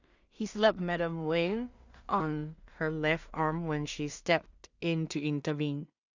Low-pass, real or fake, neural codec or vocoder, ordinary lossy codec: 7.2 kHz; fake; codec, 16 kHz in and 24 kHz out, 0.4 kbps, LongCat-Audio-Codec, two codebook decoder; Opus, 64 kbps